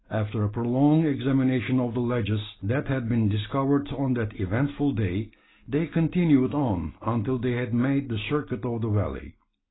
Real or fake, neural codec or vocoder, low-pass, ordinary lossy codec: real; none; 7.2 kHz; AAC, 16 kbps